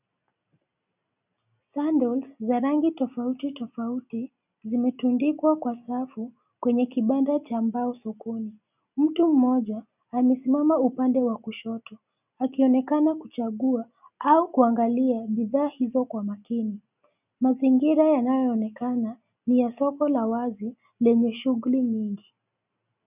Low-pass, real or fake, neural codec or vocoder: 3.6 kHz; real; none